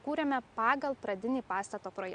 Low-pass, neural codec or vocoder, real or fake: 9.9 kHz; none; real